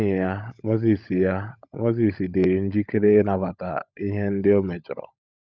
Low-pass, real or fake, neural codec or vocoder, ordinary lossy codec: none; fake; codec, 16 kHz, 16 kbps, FunCodec, trained on LibriTTS, 50 frames a second; none